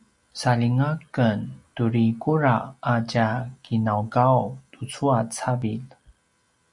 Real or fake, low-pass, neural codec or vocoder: real; 10.8 kHz; none